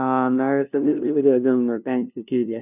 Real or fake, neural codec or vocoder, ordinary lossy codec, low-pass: fake; codec, 16 kHz, 0.5 kbps, FunCodec, trained on LibriTTS, 25 frames a second; none; 3.6 kHz